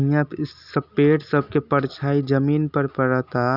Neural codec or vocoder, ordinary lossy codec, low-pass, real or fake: none; none; 5.4 kHz; real